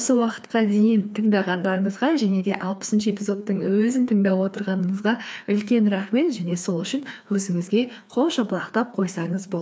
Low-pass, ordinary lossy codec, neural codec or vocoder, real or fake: none; none; codec, 16 kHz, 2 kbps, FreqCodec, larger model; fake